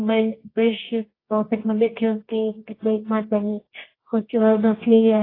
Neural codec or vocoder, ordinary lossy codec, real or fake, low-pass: codec, 24 kHz, 0.9 kbps, WavTokenizer, medium music audio release; AAC, 24 kbps; fake; 5.4 kHz